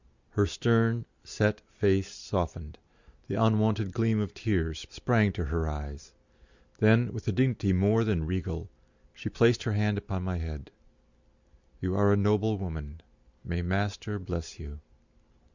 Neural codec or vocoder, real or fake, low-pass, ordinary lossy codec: none; real; 7.2 kHz; Opus, 64 kbps